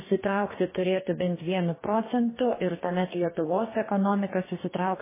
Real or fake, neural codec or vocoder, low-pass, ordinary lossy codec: fake; codec, 44.1 kHz, 2.6 kbps, DAC; 3.6 kHz; MP3, 16 kbps